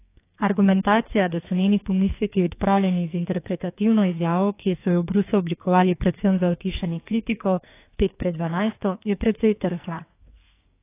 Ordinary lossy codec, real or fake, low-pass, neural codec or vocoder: AAC, 24 kbps; fake; 3.6 kHz; codec, 44.1 kHz, 2.6 kbps, SNAC